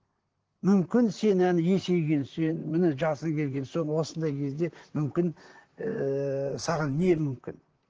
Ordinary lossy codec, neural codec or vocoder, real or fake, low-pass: Opus, 16 kbps; vocoder, 44.1 kHz, 128 mel bands, Pupu-Vocoder; fake; 7.2 kHz